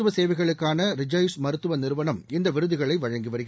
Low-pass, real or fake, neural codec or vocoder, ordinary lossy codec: none; real; none; none